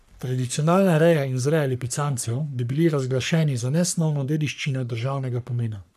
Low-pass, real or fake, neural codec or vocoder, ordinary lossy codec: 14.4 kHz; fake; codec, 44.1 kHz, 3.4 kbps, Pupu-Codec; none